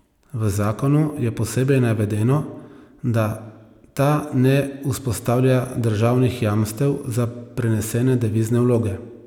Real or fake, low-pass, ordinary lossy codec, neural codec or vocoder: real; 19.8 kHz; none; none